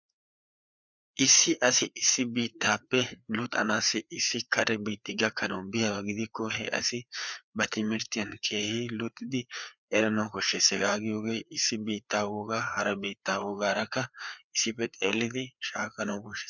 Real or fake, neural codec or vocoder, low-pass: fake; codec, 16 kHz, 4 kbps, FreqCodec, larger model; 7.2 kHz